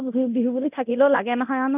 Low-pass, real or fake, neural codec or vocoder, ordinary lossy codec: 3.6 kHz; fake; codec, 24 kHz, 0.9 kbps, DualCodec; none